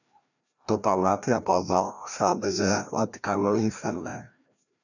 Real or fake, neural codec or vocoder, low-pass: fake; codec, 16 kHz, 1 kbps, FreqCodec, larger model; 7.2 kHz